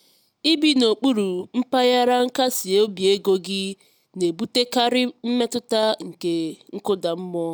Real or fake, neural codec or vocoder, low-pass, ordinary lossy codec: real; none; none; none